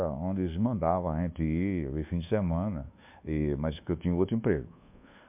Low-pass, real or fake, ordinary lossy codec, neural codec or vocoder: 3.6 kHz; fake; MP3, 32 kbps; codec, 24 kHz, 1.2 kbps, DualCodec